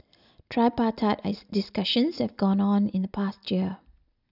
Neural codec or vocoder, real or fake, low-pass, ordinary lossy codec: none; real; 5.4 kHz; none